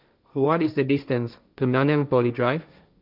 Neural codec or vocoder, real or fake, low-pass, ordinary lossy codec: codec, 16 kHz, 1.1 kbps, Voila-Tokenizer; fake; 5.4 kHz; none